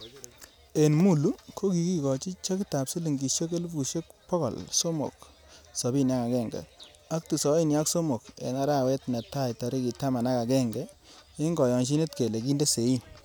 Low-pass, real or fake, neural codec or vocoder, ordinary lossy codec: none; real; none; none